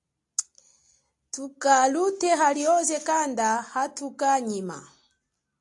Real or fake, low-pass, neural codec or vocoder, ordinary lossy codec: real; 10.8 kHz; none; AAC, 64 kbps